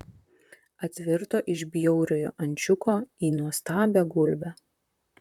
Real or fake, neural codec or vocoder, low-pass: fake; vocoder, 44.1 kHz, 128 mel bands, Pupu-Vocoder; 19.8 kHz